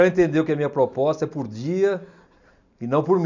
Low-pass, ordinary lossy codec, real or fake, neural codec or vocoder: 7.2 kHz; none; real; none